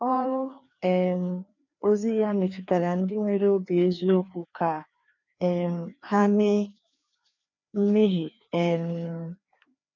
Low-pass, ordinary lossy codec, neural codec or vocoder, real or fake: 7.2 kHz; none; codec, 16 kHz, 2 kbps, FreqCodec, larger model; fake